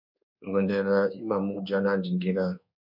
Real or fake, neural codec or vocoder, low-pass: fake; codec, 24 kHz, 1.2 kbps, DualCodec; 5.4 kHz